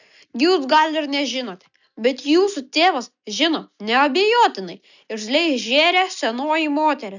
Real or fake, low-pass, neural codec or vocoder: real; 7.2 kHz; none